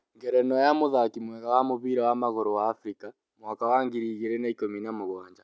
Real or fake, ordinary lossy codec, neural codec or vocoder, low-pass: real; none; none; none